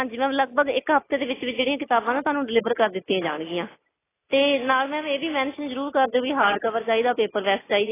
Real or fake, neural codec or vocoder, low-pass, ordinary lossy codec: real; none; 3.6 kHz; AAC, 16 kbps